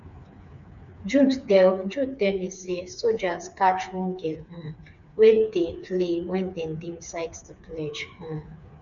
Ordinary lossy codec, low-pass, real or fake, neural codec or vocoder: none; 7.2 kHz; fake; codec, 16 kHz, 4 kbps, FreqCodec, smaller model